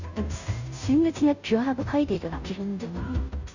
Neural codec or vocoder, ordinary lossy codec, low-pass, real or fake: codec, 16 kHz, 0.5 kbps, FunCodec, trained on Chinese and English, 25 frames a second; none; 7.2 kHz; fake